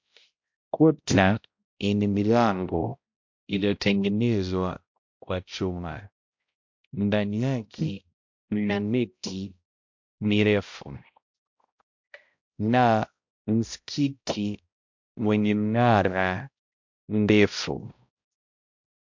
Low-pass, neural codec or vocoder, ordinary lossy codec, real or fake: 7.2 kHz; codec, 16 kHz, 0.5 kbps, X-Codec, HuBERT features, trained on balanced general audio; MP3, 48 kbps; fake